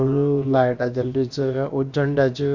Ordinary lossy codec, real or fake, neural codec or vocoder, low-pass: Opus, 64 kbps; fake; codec, 16 kHz, about 1 kbps, DyCAST, with the encoder's durations; 7.2 kHz